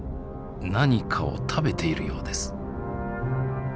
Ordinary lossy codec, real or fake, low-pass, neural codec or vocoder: none; real; none; none